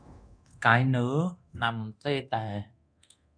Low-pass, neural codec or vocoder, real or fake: 9.9 kHz; codec, 24 kHz, 0.9 kbps, DualCodec; fake